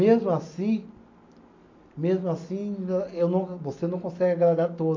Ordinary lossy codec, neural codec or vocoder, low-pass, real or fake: MP3, 48 kbps; none; 7.2 kHz; real